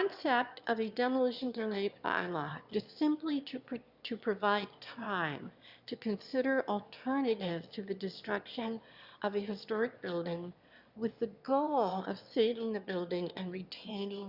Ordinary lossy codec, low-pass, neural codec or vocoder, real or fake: Opus, 64 kbps; 5.4 kHz; autoencoder, 22.05 kHz, a latent of 192 numbers a frame, VITS, trained on one speaker; fake